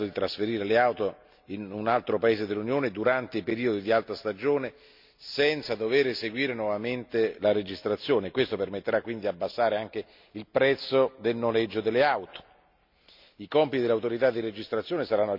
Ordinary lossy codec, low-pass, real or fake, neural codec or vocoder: MP3, 48 kbps; 5.4 kHz; real; none